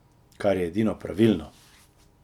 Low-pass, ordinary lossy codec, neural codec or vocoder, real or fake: 19.8 kHz; none; none; real